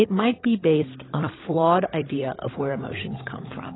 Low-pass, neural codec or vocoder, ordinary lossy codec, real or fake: 7.2 kHz; codec, 16 kHz, 16 kbps, FunCodec, trained on LibriTTS, 50 frames a second; AAC, 16 kbps; fake